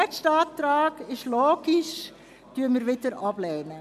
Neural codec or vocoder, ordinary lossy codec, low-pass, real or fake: none; MP3, 96 kbps; 14.4 kHz; real